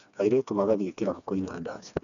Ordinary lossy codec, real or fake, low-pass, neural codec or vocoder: none; fake; 7.2 kHz; codec, 16 kHz, 2 kbps, FreqCodec, smaller model